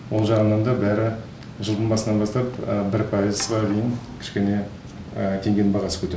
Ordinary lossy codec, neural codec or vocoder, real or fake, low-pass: none; none; real; none